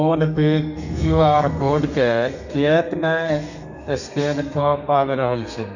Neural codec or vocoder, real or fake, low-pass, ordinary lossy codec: codec, 32 kHz, 1.9 kbps, SNAC; fake; 7.2 kHz; none